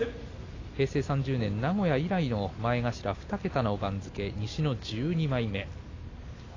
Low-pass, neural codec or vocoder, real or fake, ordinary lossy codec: 7.2 kHz; none; real; AAC, 32 kbps